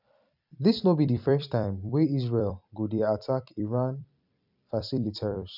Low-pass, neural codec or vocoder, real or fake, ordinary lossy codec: 5.4 kHz; vocoder, 44.1 kHz, 128 mel bands every 256 samples, BigVGAN v2; fake; none